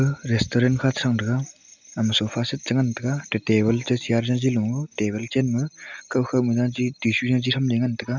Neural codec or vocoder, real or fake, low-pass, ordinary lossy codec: none; real; 7.2 kHz; none